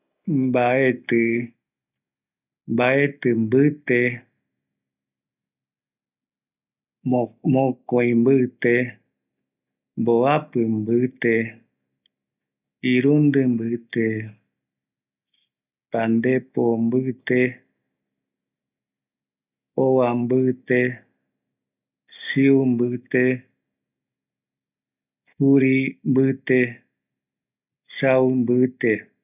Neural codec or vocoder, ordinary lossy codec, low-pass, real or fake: none; none; 3.6 kHz; real